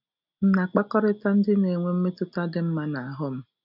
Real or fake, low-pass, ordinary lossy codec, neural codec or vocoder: real; 5.4 kHz; none; none